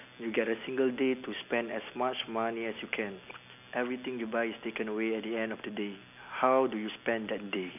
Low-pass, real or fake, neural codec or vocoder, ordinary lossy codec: 3.6 kHz; real; none; none